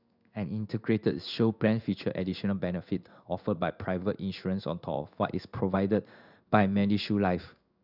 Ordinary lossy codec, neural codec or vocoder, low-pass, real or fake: none; codec, 16 kHz in and 24 kHz out, 1 kbps, XY-Tokenizer; 5.4 kHz; fake